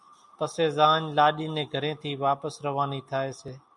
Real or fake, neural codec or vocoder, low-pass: real; none; 10.8 kHz